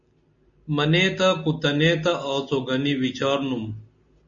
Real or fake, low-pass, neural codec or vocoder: real; 7.2 kHz; none